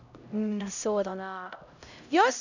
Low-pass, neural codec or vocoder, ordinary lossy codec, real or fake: 7.2 kHz; codec, 16 kHz, 0.5 kbps, X-Codec, HuBERT features, trained on LibriSpeech; none; fake